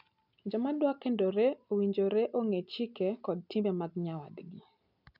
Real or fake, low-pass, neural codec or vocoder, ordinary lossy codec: real; 5.4 kHz; none; none